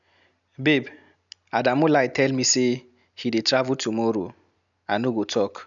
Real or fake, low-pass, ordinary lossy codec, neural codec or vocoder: real; 7.2 kHz; none; none